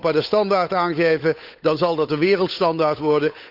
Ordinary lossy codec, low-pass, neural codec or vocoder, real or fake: none; 5.4 kHz; codec, 16 kHz, 8 kbps, FunCodec, trained on Chinese and English, 25 frames a second; fake